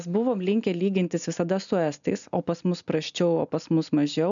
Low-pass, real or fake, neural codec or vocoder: 7.2 kHz; real; none